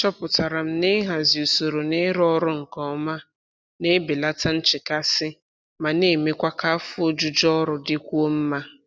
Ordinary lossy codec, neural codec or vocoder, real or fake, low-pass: none; none; real; none